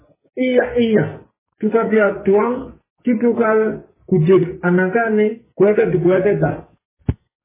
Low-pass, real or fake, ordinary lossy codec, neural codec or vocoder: 3.6 kHz; fake; MP3, 16 kbps; codec, 44.1 kHz, 2.6 kbps, SNAC